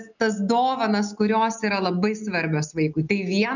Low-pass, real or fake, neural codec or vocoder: 7.2 kHz; real; none